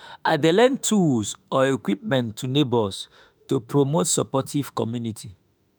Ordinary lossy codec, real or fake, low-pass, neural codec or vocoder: none; fake; none; autoencoder, 48 kHz, 32 numbers a frame, DAC-VAE, trained on Japanese speech